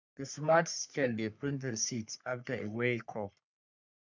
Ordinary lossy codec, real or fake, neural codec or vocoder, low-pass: none; fake; codec, 44.1 kHz, 3.4 kbps, Pupu-Codec; 7.2 kHz